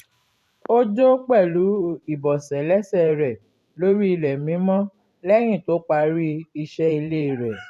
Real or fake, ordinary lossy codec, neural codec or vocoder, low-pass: fake; none; vocoder, 44.1 kHz, 128 mel bands every 512 samples, BigVGAN v2; 14.4 kHz